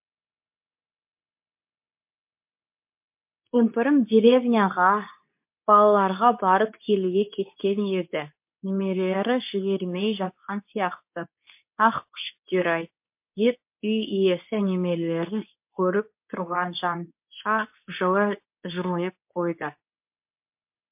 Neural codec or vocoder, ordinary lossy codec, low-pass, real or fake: codec, 24 kHz, 0.9 kbps, WavTokenizer, medium speech release version 2; MP3, 32 kbps; 3.6 kHz; fake